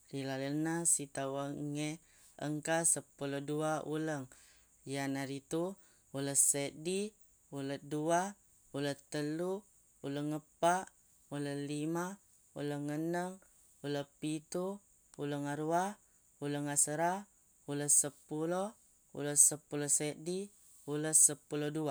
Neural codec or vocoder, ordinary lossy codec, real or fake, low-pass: none; none; real; none